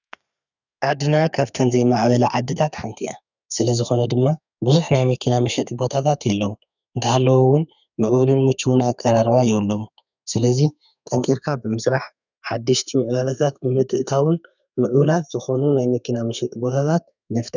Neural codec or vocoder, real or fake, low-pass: codec, 32 kHz, 1.9 kbps, SNAC; fake; 7.2 kHz